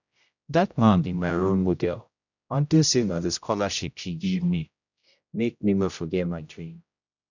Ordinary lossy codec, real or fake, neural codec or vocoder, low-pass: none; fake; codec, 16 kHz, 0.5 kbps, X-Codec, HuBERT features, trained on general audio; 7.2 kHz